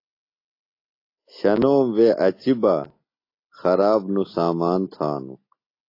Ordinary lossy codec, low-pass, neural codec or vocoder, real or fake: AAC, 32 kbps; 5.4 kHz; none; real